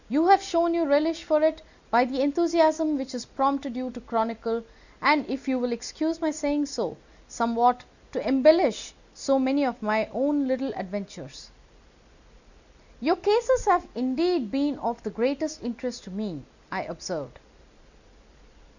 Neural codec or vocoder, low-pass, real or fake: none; 7.2 kHz; real